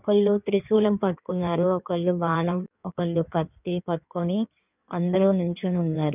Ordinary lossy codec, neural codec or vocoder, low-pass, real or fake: none; codec, 16 kHz in and 24 kHz out, 1.1 kbps, FireRedTTS-2 codec; 3.6 kHz; fake